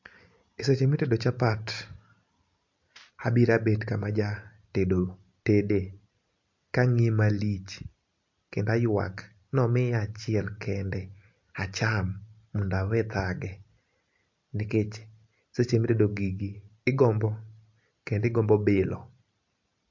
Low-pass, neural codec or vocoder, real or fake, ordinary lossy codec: 7.2 kHz; none; real; MP3, 48 kbps